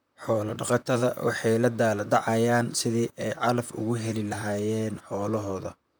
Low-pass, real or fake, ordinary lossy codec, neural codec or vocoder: none; fake; none; vocoder, 44.1 kHz, 128 mel bands, Pupu-Vocoder